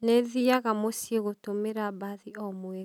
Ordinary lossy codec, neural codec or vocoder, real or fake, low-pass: none; none; real; 19.8 kHz